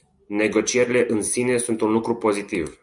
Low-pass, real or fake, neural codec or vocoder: 10.8 kHz; real; none